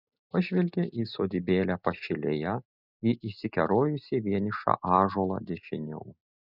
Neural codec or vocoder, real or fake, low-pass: none; real; 5.4 kHz